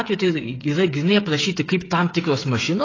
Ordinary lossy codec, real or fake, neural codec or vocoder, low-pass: AAC, 32 kbps; fake; codec, 16 kHz, 8 kbps, FreqCodec, smaller model; 7.2 kHz